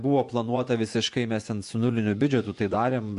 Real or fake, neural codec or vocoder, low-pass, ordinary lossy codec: fake; vocoder, 24 kHz, 100 mel bands, Vocos; 10.8 kHz; AAC, 64 kbps